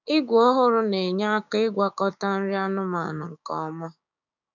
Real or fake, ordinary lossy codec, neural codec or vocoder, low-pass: fake; none; codec, 16 kHz, 6 kbps, DAC; 7.2 kHz